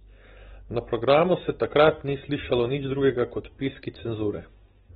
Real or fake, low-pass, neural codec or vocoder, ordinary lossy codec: real; 9.9 kHz; none; AAC, 16 kbps